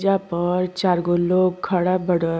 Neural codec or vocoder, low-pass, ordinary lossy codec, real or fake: none; none; none; real